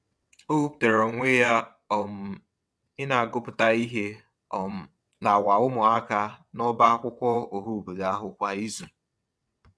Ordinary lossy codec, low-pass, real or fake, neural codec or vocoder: none; none; fake; vocoder, 22.05 kHz, 80 mel bands, WaveNeXt